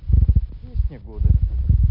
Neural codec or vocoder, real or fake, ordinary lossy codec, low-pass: none; real; none; 5.4 kHz